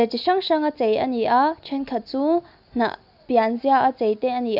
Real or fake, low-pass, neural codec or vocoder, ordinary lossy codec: real; 5.4 kHz; none; none